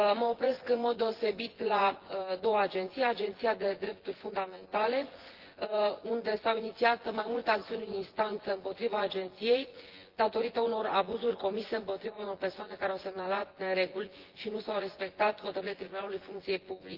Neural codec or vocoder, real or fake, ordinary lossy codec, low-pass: vocoder, 24 kHz, 100 mel bands, Vocos; fake; Opus, 16 kbps; 5.4 kHz